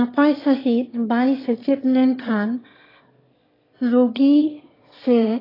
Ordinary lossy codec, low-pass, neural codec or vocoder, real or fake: AAC, 24 kbps; 5.4 kHz; autoencoder, 22.05 kHz, a latent of 192 numbers a frame, VITS, trained on one speaker; fake